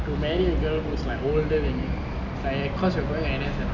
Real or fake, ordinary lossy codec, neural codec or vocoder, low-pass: real; none; none; 7.2 kHz